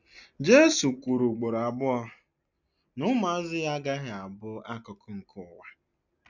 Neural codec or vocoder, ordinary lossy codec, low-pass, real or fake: none; none; 7.2 kHz; real